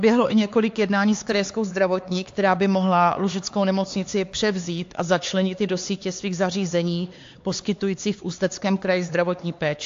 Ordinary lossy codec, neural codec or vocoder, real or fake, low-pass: AAC, 48 kbps; codec, 16 kHz, 4 kbps, X-Codec, HuBERT features, trained on LibriSpeech; fake; 7.2 kHz